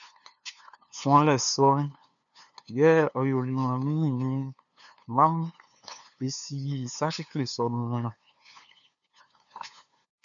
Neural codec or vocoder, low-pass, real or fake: codec, 16 kHz, 2 kbps, FunCodec, trained on LibriTTS, 25 frames a second; 7.2 kHz; fake